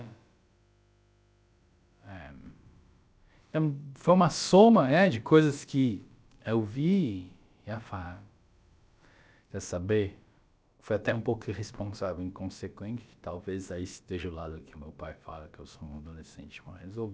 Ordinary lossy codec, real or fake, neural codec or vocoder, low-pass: none; fake; codec, 16 kHz, about 1 kbps, DyCAST, with the encoder's durations; none